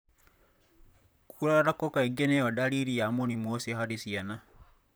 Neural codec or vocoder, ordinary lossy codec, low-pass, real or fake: vocoder, 44.1 kHz, 128 mel bands, Pupu-Vocoder; none; none; fake